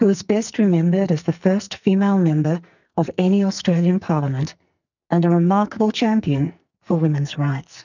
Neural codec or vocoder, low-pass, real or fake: codec, 44.1 kHz, 2.6 kbps, SNAC; 7.2 kHz; fake